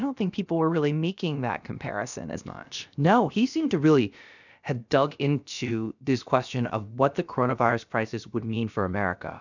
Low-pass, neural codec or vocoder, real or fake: 7.2 kHz; codec, 16 kHz, about 1 kbps, DyCAST, with the encoder's durations; fake